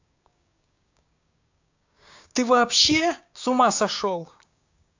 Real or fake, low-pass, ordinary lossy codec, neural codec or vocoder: fake; 7.2 kHz; AAC, 48 kbps; codec, 16 kHz, 6 kbps, DAC